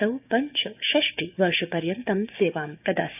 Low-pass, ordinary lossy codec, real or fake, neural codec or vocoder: 3.6 kHz; none; fake; codec, 16 kHz, 6 kbps, DAC